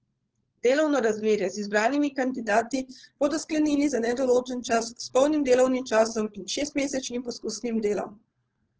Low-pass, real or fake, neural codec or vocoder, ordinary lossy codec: 7.2 kHz; fake; codec, 16 kHz, 4.8 kbps, FACodec; Opus, 16 kbps